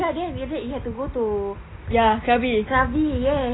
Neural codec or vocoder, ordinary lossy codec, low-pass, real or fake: none; AAC, 16 kbps; 7.2 kHz; real